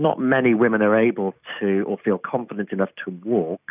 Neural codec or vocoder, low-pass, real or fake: none; 3.6 kHz; real